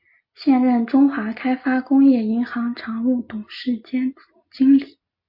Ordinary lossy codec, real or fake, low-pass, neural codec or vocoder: MP3, 32 kbps; real; 5.4 kHz; none